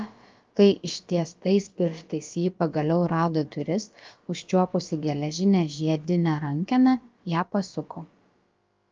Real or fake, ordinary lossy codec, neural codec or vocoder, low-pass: fake; Opus, 24 kbps; codec, 16 kHz, about 1 kbps, DyCAST, with the encoder's durations; 7.2 kHz